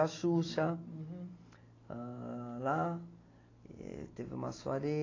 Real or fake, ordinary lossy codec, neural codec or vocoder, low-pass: real; AAC, 32 kbps; none; 7.2 kHz